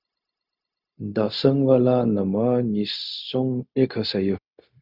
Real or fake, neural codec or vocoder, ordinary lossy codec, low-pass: fake; codec, 16 kHz, 0.4 kbps, LongCat-Audio-Codec; Opus, 64 kbps; 5.4 kHz